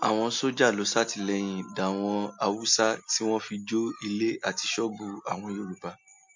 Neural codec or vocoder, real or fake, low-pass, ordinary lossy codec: none; real; 7.2 kHz; MP3, 48 kbps